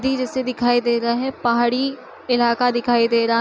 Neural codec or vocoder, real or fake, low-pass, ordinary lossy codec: none; real; none; none